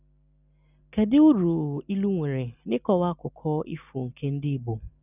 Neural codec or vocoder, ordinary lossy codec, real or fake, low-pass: none; none; real; 3.6 kHz